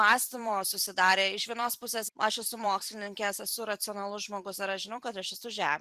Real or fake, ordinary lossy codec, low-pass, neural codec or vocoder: fake; Opus, 64 kbps; 14.4 kHz; vocoder, 44.1 kHz, 128 mel bands every 256 samples, BigVGAN v2